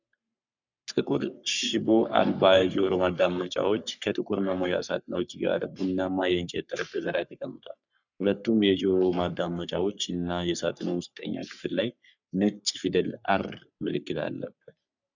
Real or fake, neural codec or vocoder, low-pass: fake; codec, 44.1 kHz, 3.4 kbps, Pupu-Codec; 7.2 kHz